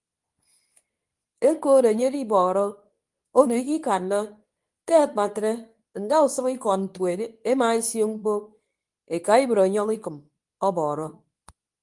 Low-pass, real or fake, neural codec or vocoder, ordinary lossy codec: 10.8 kHz; fake; codec, 24 kHz, 0.9 kbps, WavTokenizer, medium speech release version 2; Opus, 32 kbps